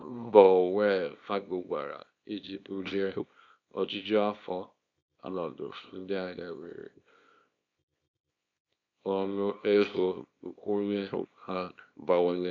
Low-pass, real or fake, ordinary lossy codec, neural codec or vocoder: 7.2 kHz; fake; none; codec, 24 kHz, 0.9 kbps, WavTokenizer, small release